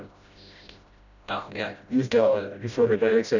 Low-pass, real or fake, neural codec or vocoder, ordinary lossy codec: 7.2 kHz; fake; codec, 16 kHz, 0.5 kbps, FreqCodec, smaller model; none